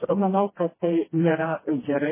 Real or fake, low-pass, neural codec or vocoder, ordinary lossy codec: fake; 3.6 kHz; codec, 16 kHz, 1 kbps, FreqCodec, smaller model; MP3, 16 kbps